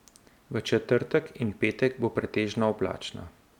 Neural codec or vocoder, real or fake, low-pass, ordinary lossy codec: none; real; 19.8 kHz; none